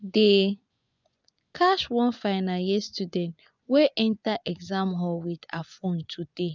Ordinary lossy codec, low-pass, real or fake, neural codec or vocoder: none; 7.2 kHz; fake; vocoder, 24 kHz, 100 mel bands, Vocos